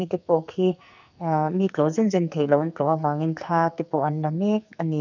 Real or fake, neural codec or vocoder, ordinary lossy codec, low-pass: fake; codec, 44.1 kHz, 2.6 kbps, SNAC; none; 7.2 kHz